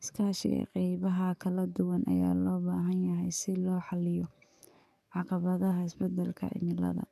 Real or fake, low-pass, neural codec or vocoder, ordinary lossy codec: fake; 14.4 kHz; codec, 44.1 kHz, 7.8 kbps, DAC; none